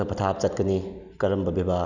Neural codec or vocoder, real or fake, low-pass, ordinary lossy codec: none; real; 7.2 kHz; none